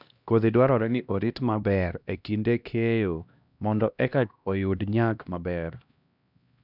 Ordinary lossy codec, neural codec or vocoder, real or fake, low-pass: none; codec, 16 kHz, 1 kbps, X-Codec, HuBERT features, trained on LibriSpeech; fake; 5.4 kHz